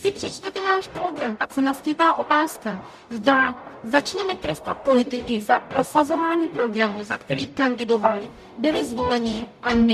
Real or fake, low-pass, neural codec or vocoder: fake; 14.4 kHz; codec, 44.1 kHz, 0.9 kbps, DAC